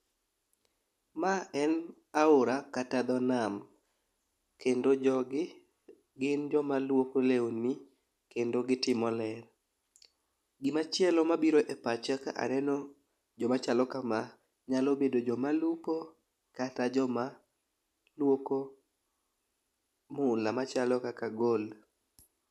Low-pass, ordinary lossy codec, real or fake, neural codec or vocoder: 14.4 kHz; AAC, 96 kbps; real; none